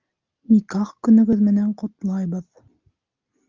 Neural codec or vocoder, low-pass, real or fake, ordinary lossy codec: none; 7.2 kHz; real; Opus, 24 kbps